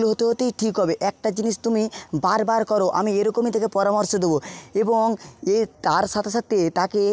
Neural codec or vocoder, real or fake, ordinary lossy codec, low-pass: none; real; none; none